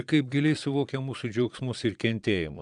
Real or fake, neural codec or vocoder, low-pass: fake; vocoder, 22.05 kHz, 80 mel bands, Vocos; 9.9 kHz